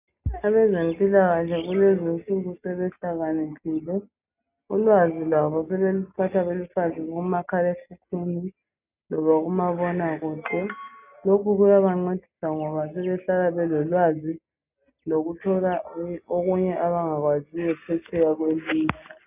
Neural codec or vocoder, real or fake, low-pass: none; real; 3.6 kHz